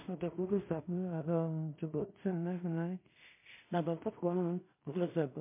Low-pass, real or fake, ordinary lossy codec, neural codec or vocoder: 3.6 kHz; fake; MP3, 24 kbps; codec, 16 kHz in and 24 kHz out, 0.4 kbps, LongCat-Audio-Codec, two codebook decoder